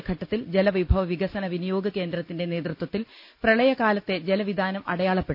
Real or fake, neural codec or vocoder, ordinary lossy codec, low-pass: real; none; none; 5.4 kHz